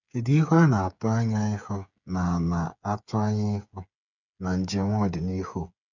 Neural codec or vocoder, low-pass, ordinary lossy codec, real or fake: codec, 16 kHz, 8 kbps, FreqCodec, smaller model; 7.2 kHz; none; fake